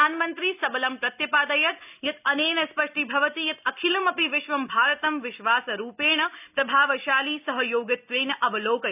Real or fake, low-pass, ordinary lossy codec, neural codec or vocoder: real; 3.6 kHz; none; none